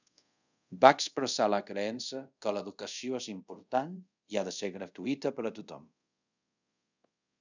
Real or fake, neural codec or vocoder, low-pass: fake; codec, 24 kHz, 0.5 kbps, DualCodec; 7.2 kHz